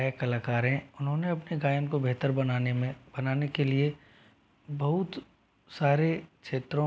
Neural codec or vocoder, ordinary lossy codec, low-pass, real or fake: none; none; none; real